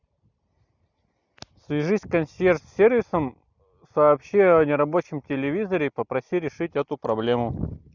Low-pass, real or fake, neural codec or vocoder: 7.2 kHz; real; none